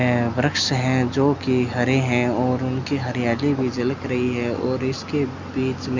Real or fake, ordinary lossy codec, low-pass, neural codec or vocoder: real; Opus, 64 kbps; 7.2 kHz; none